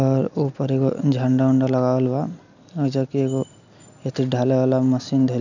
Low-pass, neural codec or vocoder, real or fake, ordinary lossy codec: 7.2 kHz; none; real; none